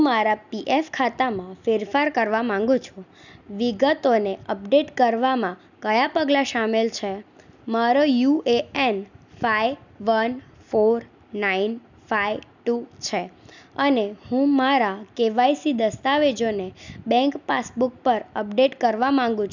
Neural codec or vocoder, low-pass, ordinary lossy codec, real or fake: none; 7.2 kHz; none; real